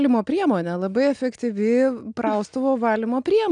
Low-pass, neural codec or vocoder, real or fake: 9.9 kHz; none; real